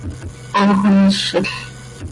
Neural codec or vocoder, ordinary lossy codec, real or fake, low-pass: none; Opus, 64 kbps; real; 10.8 kHz